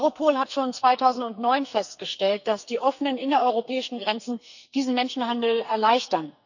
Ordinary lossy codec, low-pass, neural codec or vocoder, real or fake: none; 7.2 kHz; codec, 44.1 kHz, 2.6 kbps, SNAC; fake